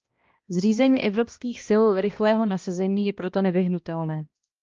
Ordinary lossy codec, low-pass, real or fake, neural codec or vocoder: Opus, 24 kbps; 7.2 kHz; fake; codec, 16 kHz, 1 kbps, X-Codec, HuBERT features, trained on balanced general audio